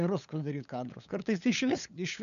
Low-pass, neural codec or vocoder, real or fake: 7.2 kHz; codec, 16 kHz, 8 kbps, FunCodec, trained on LibriTTS, 25 frames a second; fake